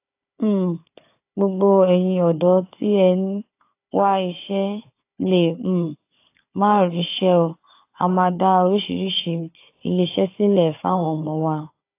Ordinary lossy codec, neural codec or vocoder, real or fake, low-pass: AAC, 24 kbps; codec, 16 kHz, 4 kbps, FunCodec, trained on Chinese and English, 50 frames a second; fake; 3.6 kHz